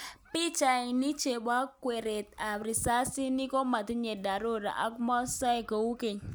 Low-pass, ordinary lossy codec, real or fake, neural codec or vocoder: none; none; real; none